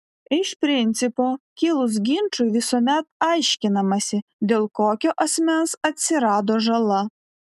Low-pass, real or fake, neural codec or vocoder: 14.4 kHz; real; none